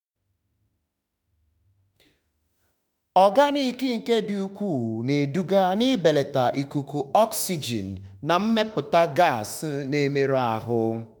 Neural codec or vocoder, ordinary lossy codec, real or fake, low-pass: autoencoder, 48 kHz, 32 numbers a frame, DAC-VAE, trained on Japanese speech; none; fake; none